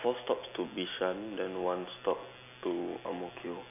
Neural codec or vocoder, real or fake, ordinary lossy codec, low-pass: none; real; none; 3.6 kHz